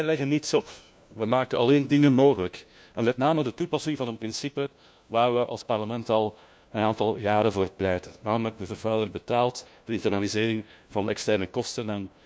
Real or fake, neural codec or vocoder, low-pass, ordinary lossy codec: fake; codec, 16 kHz, 1 kbps, FunCodec, trained on LibriTTS, 50 frames a second; none; none